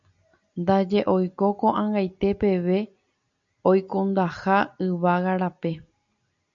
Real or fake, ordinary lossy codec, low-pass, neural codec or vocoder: real; MP3, 96 kbps; 7.2 kHz; none